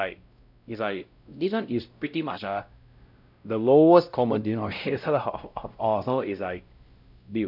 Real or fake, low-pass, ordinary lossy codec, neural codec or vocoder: fake; 5.4 kHz; none; codec, 16 kHz, 0.5 kbps, X-Codec, WavLM features, trained on Multilingual LibriSpeech